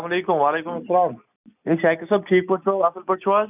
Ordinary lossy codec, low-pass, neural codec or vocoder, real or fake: none; 3.6 kHz; none; real